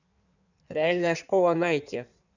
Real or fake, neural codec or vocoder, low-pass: fake; codec, 16 kHz in and 24 kHz out, 1.1 kbps, FireRedTTS-2 codec; 7.2 kHz